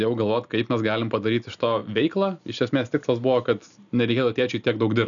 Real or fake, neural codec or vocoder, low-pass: real; none; 7.2 kHz